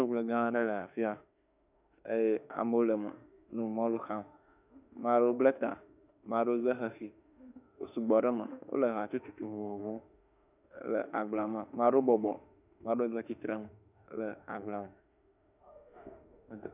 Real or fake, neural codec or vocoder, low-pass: fake; autoencoder, 48 kHz, 32 numbers a frame, DAC-VAE, trained on Japanese speech; 3.6 kHz